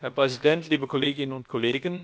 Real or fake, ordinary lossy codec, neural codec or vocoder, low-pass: fake; none; codec, 16 kHz, about 1 kbps, DyCAST, with the encoder's durations; none